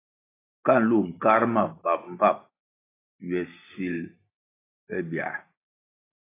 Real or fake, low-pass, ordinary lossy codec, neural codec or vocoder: fake; 3.6 kHz; AAC, 16 kbps; vocoder, 44.1 kHz, 128 mel bands every 512 samples, BigVGAN v2